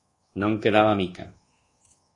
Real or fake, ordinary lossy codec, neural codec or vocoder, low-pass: fake; AAC, 32 kbps; codec, 24 kHz, 1.2 kbps, DualCodec; 10.8 kHz